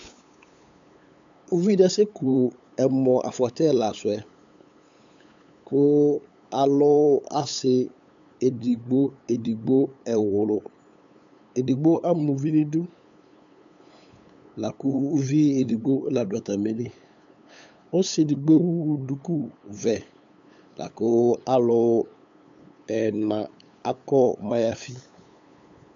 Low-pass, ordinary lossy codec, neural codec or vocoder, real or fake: 7.2 kHz; AAC, 64 kbps; codec, 16 kHz, 8 kbps, FunCodec, trained on LibriTTS, 25 frames a second; fake